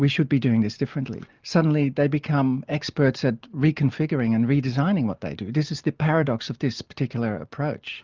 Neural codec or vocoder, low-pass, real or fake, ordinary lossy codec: none; 7.2 kHz; real; Opus, 24 kbps